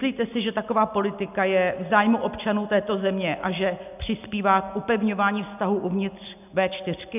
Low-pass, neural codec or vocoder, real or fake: 3.6 kHz; none; real